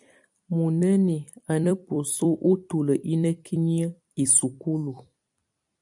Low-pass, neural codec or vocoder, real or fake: 10.8 kHz; vocoder, 44.1 kHz, 128 mel bands every 256 samples, BigVGAN v2; fake